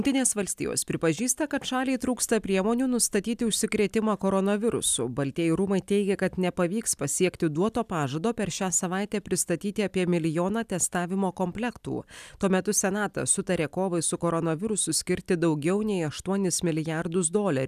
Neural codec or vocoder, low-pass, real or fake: none; 14.4 kHz; real